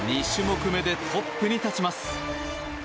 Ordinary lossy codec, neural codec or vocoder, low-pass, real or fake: none; none; none; real